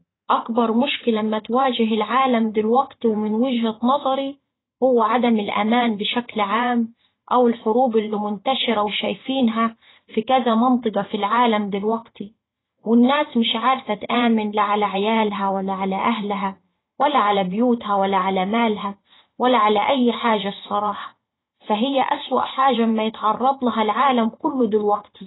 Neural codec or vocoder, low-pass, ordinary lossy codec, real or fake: vocoder, 44.1 kHz, 128 mel bands every 512 samples, BigVGAN v2; 7.2 kHz; AAC, 16 kbps; fake